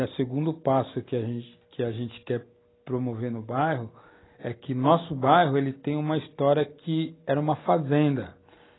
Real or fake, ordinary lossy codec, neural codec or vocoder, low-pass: real; AAC, 16 kbps; none; 7.2 kHz